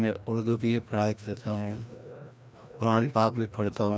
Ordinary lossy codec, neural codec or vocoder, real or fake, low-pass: none; codec, 16 kHz, 1 kbps, FreqCodec, larger model; fake; none